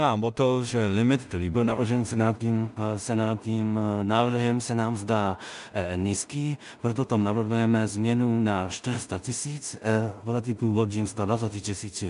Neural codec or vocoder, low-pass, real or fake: codec, 16 kHz in and 24 kHz out, 0.4 kbps, LongCat-Audio-Codec, two codebook decoder; 10.8 kHz; fake